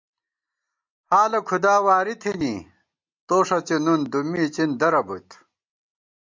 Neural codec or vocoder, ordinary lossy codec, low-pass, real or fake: none; MP3, 64 kbps; 7.2 kHz; real